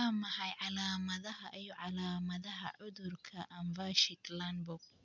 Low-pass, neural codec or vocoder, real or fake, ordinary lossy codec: 7.2 kHz; none; real; MP3, 64 kbps